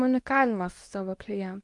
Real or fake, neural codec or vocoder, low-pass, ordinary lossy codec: fake; codec, 24 kHz, 0.9 kbps, WavTokenizer, medium speech release version 2; 10.8 kHz; Opus, 32 kbps